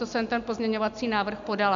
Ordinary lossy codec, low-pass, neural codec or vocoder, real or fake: AAC, 64 kbps; 7.2 kHz; none; real